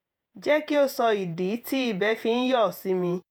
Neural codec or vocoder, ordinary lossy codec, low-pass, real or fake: vocoder, 48 kHz, 128 mel bands, Vocos; none; none; fake